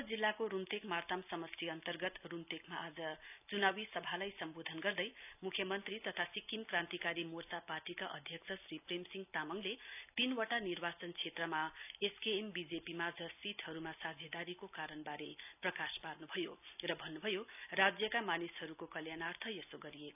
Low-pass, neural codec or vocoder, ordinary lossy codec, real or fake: 3.6 kHz; none; none; real